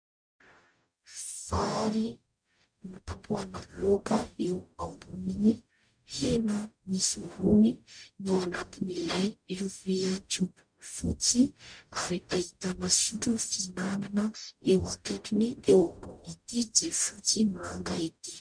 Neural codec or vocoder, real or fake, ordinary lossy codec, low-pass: codec, 44.1 kHz, 0.9 kbps, DAC; fake; MP3, 64 kbps; 9.9 kHz